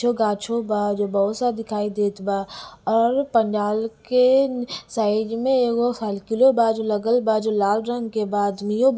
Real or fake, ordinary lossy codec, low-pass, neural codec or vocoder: real; none; none; none